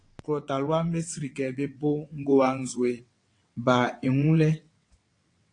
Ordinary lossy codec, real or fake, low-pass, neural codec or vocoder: AAC, 48 kbps; fake; 9.9 kHz; vocoder, 22.05 kHz, 80 mel bands, WaveNeXt